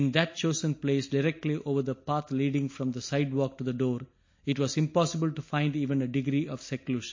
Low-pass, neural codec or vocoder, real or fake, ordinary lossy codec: 7.2 kHz; none; real; MP3, 32 kbps